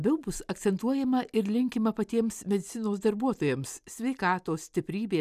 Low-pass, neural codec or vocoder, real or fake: 14.4 kHz; none; real